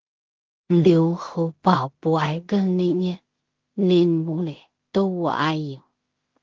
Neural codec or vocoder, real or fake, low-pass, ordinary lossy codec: codec, 16 kHz in and 24 kHz out, 0.4 kbps, LongCat-Audio-Codec, two codebook decoder; fake; 7.2 kHz; Opus, 16 kbps